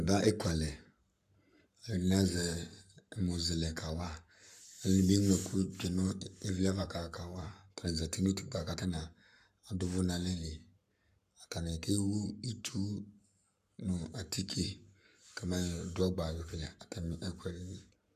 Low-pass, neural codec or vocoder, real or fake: 14.4 kHz; codec, 44.1 kHz, 7.8 kbps, Pupu-Codec; fake